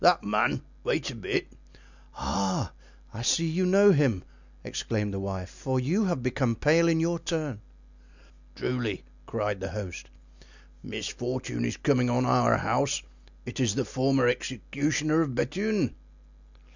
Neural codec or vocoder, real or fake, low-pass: none; real; 7.2 kHz